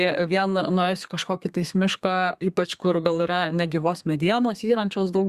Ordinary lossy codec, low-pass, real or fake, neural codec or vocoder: Opus, 64 kbps; 14.4 kHz; fake; codec, 32 kHz, 1.9 kbps, SNAC